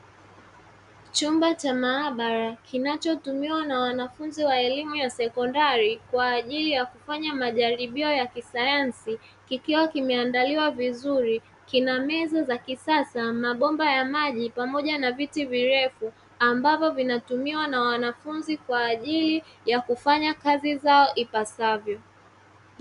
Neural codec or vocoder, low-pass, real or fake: none; 10.8 kHz; real